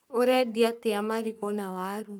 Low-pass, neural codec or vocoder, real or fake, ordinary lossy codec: none; codec, 44.1 kHz, 3.4 kbps, Pupu-Codec; fake; none